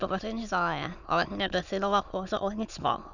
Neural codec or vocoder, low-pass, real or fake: autoencoder, 22.05 kHz, a latent of 192 numbers a frame, VITS, trained on many speakers; 7.2 kHz; fake